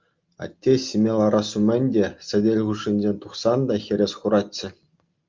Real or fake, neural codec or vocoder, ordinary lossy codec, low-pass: real; none; Opus, 24 kbps; 7.2 kHz